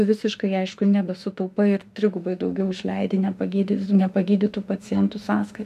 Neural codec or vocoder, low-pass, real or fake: autoencoder, 48 kHz, 32 numbers a frame, DAC-VAE, trained on Japanese speech; 14.4 kHz; fake